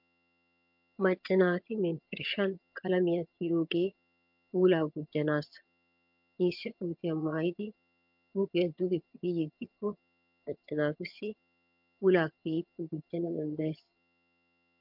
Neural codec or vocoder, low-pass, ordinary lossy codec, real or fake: vocoder, 22.05 kHz, 80 mel bands, HiFi-GAN; 5.4 kHz; MP3, 48 kbps; fake